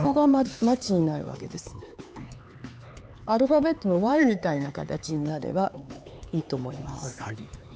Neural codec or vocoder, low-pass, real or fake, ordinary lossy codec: codec, 16 kHz, 4 kbps, X-Codec, HuBERT features, trained on LibriSpeech; none; fake; none